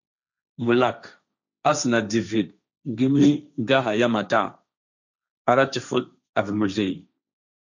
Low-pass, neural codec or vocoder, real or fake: 7.2 kHz; codec, 16 kHz, 1.1 kbps, Voila-Tokenizer; fake